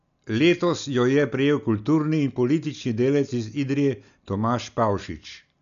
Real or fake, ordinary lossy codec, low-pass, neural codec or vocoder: real; none; 7.2 kHz; none